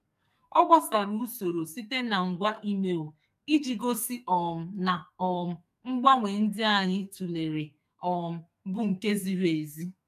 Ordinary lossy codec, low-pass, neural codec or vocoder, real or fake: MP3, 96 kbps; 14.4 kHz; codec, 44.1 kHz, 2.6 kbps, SNAC; fake